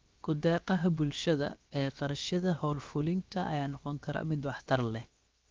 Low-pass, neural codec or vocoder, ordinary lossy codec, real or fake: 7.2 kHz; codec, 16 kHz, 0.7 kbps, FocalCodec; Opus, 24 kbps; fake